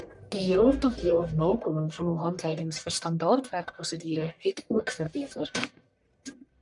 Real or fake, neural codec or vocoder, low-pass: fake; codec, 44.1 kHz, 1.7 kbps, Pupu-Codec; 10.8 kHz